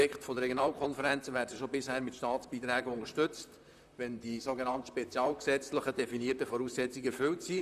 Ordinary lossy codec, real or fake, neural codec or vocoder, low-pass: none; fake; vocoder, 44.1 kHz, 128 mel bands, Pupu-Vocoder; 14.4 kHz